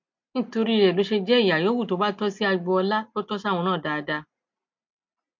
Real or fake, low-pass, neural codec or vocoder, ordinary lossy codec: real; 7.2 kHz; none; MP3, 48 kbps